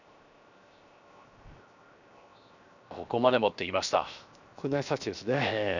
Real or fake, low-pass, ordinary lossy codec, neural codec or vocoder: fake; 7.2 kHz; none; codec, 16 kHz, 0.7 kbps, FocalCodec